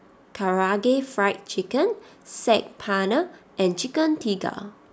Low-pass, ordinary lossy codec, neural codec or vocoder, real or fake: none; none; none; real